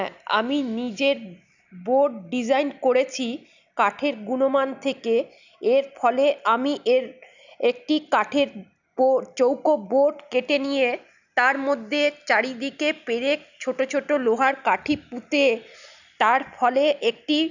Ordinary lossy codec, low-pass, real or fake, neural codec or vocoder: none; 7.2 kHz; real; none